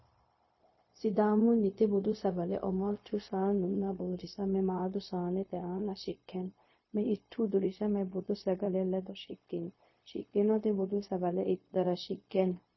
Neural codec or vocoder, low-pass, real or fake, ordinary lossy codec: codec, 16 kHz, 0.4 kbps, LongCat-Audio-Codec; 7.2 kHz; fake; MP3, 24 kbps